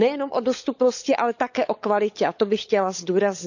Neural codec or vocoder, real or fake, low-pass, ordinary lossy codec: codec, 16 kHz, 16 kbps, FunCodec, trained on LibriTTS, 50 frames a second; fake; 7.2 kHz; none